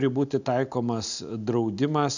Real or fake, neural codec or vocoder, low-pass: real; none; 7.2 kHz